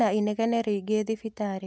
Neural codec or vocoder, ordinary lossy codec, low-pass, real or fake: none; none; none; real